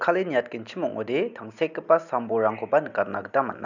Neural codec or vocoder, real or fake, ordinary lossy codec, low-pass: none; real; none; 7.2 kHz